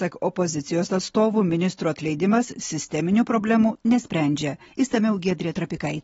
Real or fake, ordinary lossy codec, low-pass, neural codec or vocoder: real; AAC, 24 kbps; 10.8 kHz; none